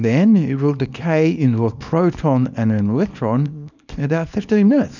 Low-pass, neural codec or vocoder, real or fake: 7.2 kHz; codec, 24 kHz, 0.9 kbps, WavTokenizer, small release; fake